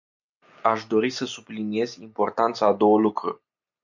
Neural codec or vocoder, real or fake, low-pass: none; real; 7.2 kHz